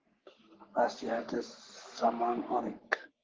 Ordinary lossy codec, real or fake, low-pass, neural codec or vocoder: Opus, 16 kbps; fake; 7.2 kHz; codec, 44.1 kHz, 3.4 kbps, Pupu-Codec